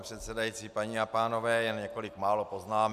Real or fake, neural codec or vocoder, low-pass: real; none; 14.4 kHz